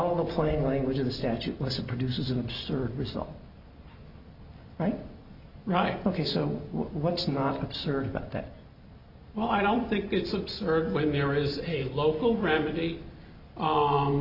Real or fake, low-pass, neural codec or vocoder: real; 5.4 kHz; none